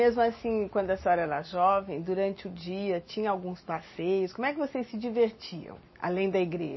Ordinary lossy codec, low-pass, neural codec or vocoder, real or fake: MP3, 24 kbps; 7.2 kHz; none; real